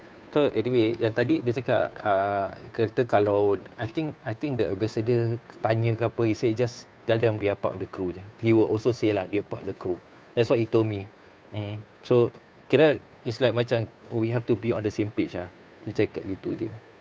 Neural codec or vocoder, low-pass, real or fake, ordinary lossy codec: codec, 16 kHz, 2 kbps, FunCodec, trained on Chinese and English, 25 frames a second; none; fake; none